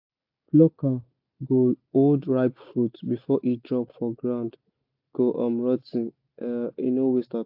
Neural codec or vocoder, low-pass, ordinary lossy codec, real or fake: none; 5.4 kHz; AAC, 48 kbps; real